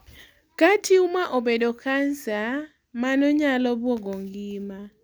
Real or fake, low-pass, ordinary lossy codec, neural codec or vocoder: real; none; none; none